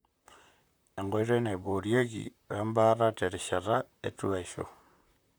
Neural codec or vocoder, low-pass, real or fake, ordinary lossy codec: vocoder, 44.1 kHz, 128 mel bands, Pupu-Vocoder; none; fake; none